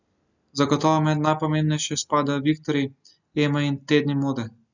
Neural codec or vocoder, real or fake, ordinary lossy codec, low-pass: none; real; none; 7.2 kHz